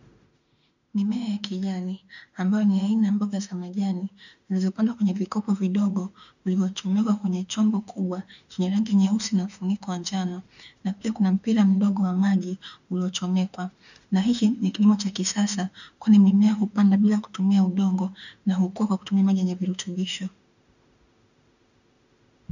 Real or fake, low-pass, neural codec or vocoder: fake; 7.2 kHz; autoencoder, 48 kHz, 32 numbers a frame, DAC-VAE, trained on Japanese speech